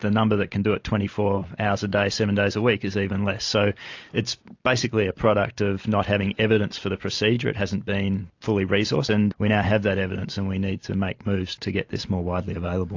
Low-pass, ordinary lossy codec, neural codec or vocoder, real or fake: 7.2 kHz; AAC, 48 kbps; none; real